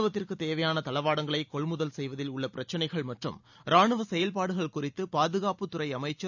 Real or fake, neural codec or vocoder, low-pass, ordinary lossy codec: real; none; 7.2 kHz; none